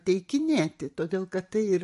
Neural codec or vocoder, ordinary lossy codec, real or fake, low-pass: vocoder, 44.1 kHz, 128 mel bands every 512 samples, BigVGAN v2; MP3, 48 kbps; fake; 14.4 kHz